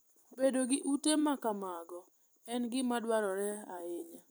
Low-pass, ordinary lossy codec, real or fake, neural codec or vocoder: none; none; fake; vocoder, 44.1 kHz, 128 mel bands every 256 samples, BigVGAN v2